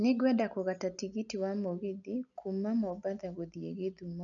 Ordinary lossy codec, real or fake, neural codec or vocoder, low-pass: none; real; none; 7.2 kHz